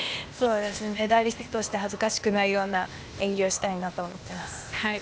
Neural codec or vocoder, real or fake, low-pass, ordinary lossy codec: codec, 16 kHz, 0.8 kbps, ZipCodec; fake; none; none